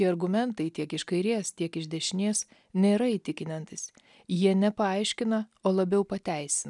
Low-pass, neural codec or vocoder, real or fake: 10.8 kHz; none; real